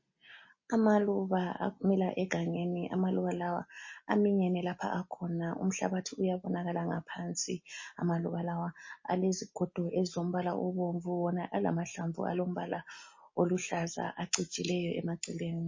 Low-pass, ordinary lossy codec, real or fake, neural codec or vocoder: 7.2 kHz; MP3, 32 kbps; real; none